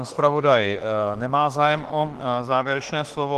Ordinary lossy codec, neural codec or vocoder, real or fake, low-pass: Opus, 16 kbps; autoencoder, 48 kHz, 32 numbers a frame, DAC-VAE, trained on Japanese speech; fake; 14.4 kHz